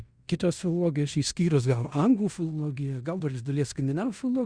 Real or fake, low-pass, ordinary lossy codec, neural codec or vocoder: fake; 9.9 kHz; Opus, 64 kbps; codec, 16 kHz in and 24 kHz out, 0.9 kbps, LongCat-Audio-Codec, fine tuned four codebook decoder